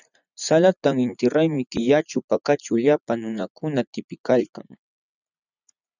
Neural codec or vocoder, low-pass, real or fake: vocoder, 44.1 kHz, 80 mel bands, Vocos; 7.2 kHz; fake